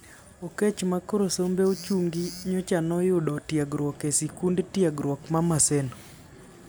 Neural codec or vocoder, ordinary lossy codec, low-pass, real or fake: none; none; none; real